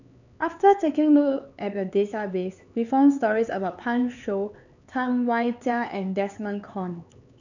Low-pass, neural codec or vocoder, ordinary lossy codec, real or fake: 7.2 kHz; codec, 16 kHz, 4 kbps, X-Codec, HuBERT features, trained on LibriSpeech; none; fake